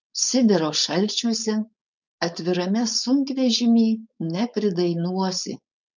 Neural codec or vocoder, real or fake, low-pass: codec, 16 kHz, 4.8 kbps, FACodec; fake; 7.2 kHz